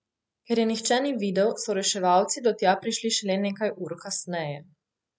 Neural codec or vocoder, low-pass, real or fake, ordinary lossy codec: none; none; real; none